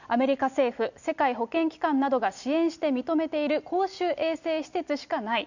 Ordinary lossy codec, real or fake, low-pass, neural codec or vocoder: none; real; 7.2 kHz; none